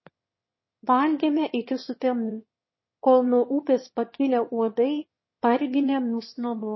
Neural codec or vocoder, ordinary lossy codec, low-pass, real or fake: autoencoder, 22.05 kHz, a latent of 192 numbers a frame, VITS, trained on one speaker; MP3, 24 kbps; 7.2 kHz; fake